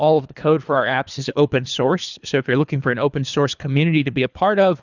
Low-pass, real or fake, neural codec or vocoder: 7.2 kHz; fake; codec, 24 kHz, 3 kbps, HILCodec